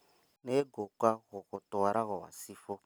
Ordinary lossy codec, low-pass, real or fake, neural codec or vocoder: none; none; real; none